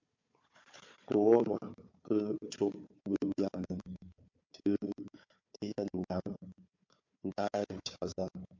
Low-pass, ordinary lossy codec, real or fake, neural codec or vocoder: 7.2 kHz; MP3, 48 kbps; fake; codec, 16 kHz, 4 kbps, FunCodec, trained on Chinese and English, 50 frames a second